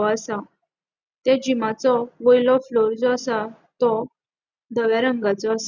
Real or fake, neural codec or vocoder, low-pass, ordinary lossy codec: real; none; 7.2 kHz; Opus, 64 kbps